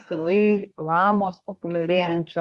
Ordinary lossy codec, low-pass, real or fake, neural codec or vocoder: Opus, 64 kbps; 10.8 kHz; fake; codec, 24 kHz, 1 kbps, SNAC